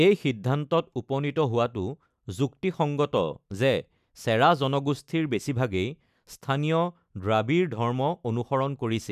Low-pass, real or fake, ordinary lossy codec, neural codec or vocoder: 14.4 kHz; real; none; none